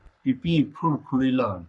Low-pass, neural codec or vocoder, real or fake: 10.8 kHz; codec, 44.1 kHz, 3.4 kbps, Pupu-Codec; fake